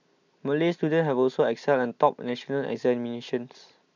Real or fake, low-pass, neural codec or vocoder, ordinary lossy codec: real; 7.2 kHz; none; none